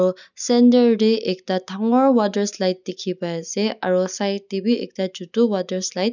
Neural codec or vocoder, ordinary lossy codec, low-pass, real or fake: none; none; 7.2 kHz; real